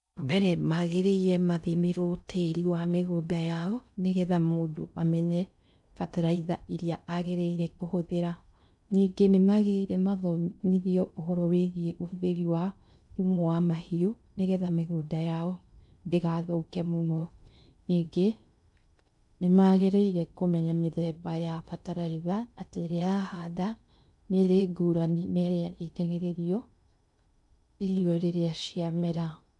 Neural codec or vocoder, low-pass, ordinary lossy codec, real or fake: codec, 16 kHz in and 24 kHz out, 0.6 kbps, FocalCodec, streaming, 4096 codes; 10.8 kHz; none; fake